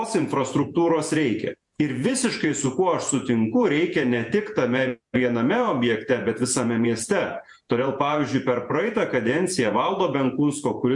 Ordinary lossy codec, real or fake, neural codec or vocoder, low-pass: MP3, 64 kbps; fake; vocoder, 48 kHz, 128 mel bands, Vocos; 10.8 kHz